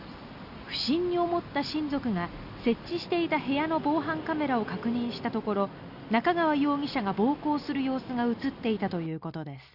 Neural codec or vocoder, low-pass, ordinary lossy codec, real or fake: none; 5.4 kHz; Opus, 64 kbps; real